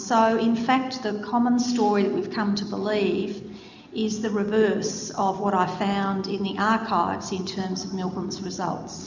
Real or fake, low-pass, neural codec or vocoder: real; 7.2 kHz; none